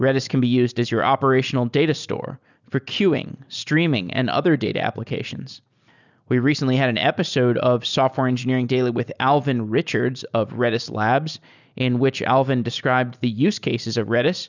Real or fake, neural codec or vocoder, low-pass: real; none; 7.2 kHz